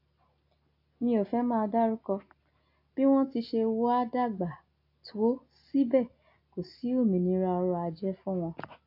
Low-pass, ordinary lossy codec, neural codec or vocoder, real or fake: 5.4 kHz; AAC, 32 kbps; none; real